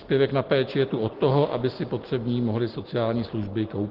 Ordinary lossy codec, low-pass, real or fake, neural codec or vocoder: Opus, 16 kbps; 5.4 kHz; real; none